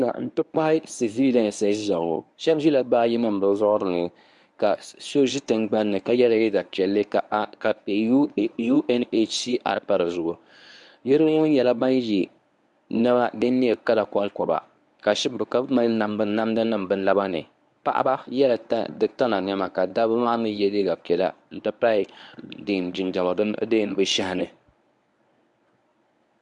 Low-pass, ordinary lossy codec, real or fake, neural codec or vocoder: 10.8 kHz; MP3, 96 kbps; fake; codec, 24 kHz, 0.9 kbps, WavTokenizer, medium speech release version 1